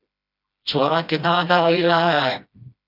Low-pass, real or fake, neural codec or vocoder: 5.4 kHz; fake; codec, 16 kHz, 1 kbps, FreqCodec, smaller model